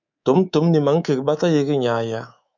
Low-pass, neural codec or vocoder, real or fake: 7.2 kHz; codec, 24 kHz, 3.1 kbps, DualCodec; fake